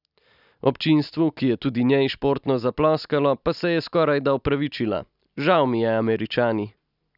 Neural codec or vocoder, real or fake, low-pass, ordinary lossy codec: none; real; 5.4 kHz; none